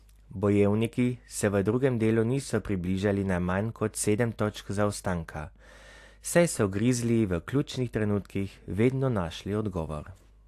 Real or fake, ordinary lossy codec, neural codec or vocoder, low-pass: real; AAC, 64 kbps; none; 14.4 kHz